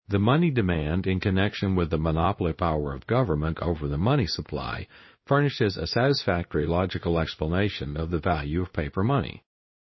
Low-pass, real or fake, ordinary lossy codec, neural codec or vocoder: 7.2 kHz; fake; MP3, 24 kbps; codec, 16 kHz in and 24 kHz out, 1 kbps, XY-Tokenizer